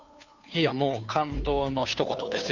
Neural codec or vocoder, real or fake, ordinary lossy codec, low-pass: codec, 16 kHz, 2 kbps, FunCodec, trained on Chinese and English, 25 frames a second; fake; none; 7.2 kHz